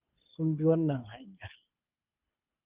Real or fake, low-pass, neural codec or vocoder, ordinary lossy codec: fake; 3.6 kHz; codec, 24 kHz, 6 kbps, HILCodec; Opus, 32 kbps